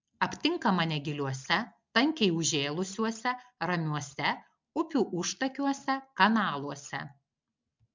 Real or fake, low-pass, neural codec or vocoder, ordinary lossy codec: real; 7.2 kHz; none; MP3, 64 kbps